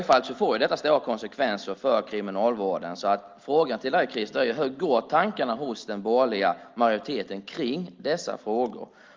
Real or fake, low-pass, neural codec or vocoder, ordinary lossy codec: real; 7.2 kHz; none; Opus, 24 kbps